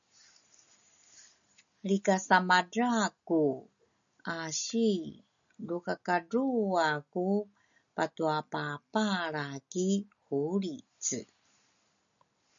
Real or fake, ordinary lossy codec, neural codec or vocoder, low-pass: real; MP3, 96 kbps; none; 7.2 kHz